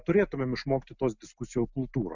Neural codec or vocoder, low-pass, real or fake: none; 7.2 kHz; real